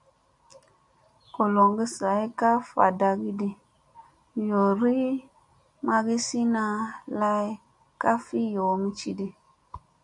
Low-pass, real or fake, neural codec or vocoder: 10.8 kHz; real; none